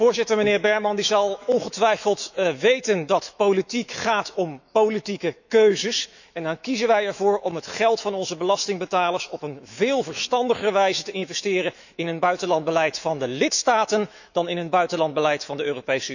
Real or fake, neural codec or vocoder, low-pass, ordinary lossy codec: fake; autoencoder, 48 kHz, 128 numbers a frame, DAC-VAE, trained on Japanese speech; 7.2 kHz; none